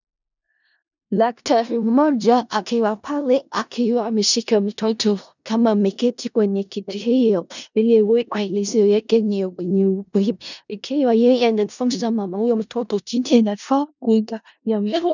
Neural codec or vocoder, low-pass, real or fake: codec, 16 kHz in and 24 kHz out, 0.4 kbps, LongCat-Audio-Codec, four codebook decoder; 7.2 kHz; fake